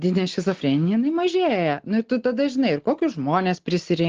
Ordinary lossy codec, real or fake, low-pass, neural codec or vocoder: Opus, 16 kbps; real; 7.2 kHz; none